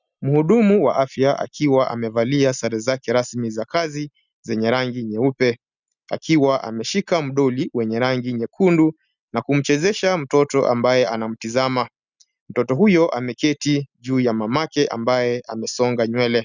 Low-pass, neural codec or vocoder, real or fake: 7.2 kHz; none; real